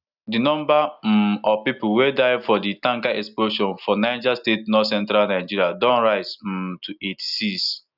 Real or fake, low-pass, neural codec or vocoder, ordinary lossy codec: real; 5.4 kHz; none; none